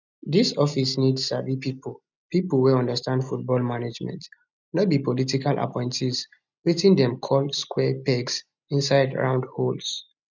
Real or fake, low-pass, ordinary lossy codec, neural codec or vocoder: real; 7.2 kHz; none; none